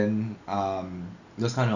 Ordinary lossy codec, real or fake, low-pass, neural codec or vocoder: none; real; 7.2 kHz; none